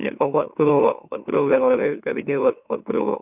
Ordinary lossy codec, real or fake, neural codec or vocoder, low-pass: none; fake; autoencoder, 44.1 kHz, a latent of 192 numbers a frame, MeloTTS; 3.6 kHz